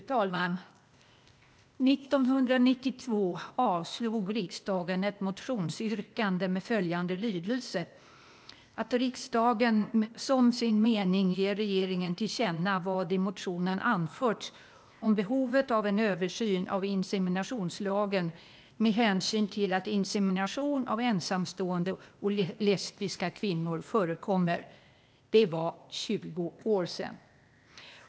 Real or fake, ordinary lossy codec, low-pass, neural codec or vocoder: fake; none; none; codec, 16 kHz, 0.8 kbps, ZipCodec